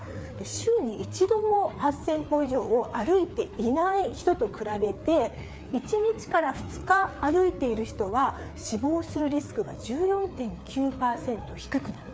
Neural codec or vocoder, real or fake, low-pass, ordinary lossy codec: codec, 16 kHz, 4 kbps, FreqCodec, larger model; fake; none; none